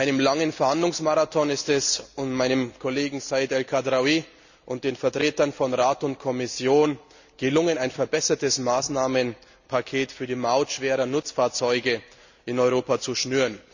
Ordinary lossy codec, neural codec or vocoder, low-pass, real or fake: none; none; 7.2 kHz; real